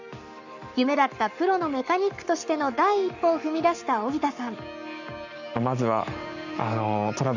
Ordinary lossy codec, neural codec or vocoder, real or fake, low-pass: none; codec, 44.1 kHz, 7.8 kbps, Pupu-Codec; fake; 7.2 kHz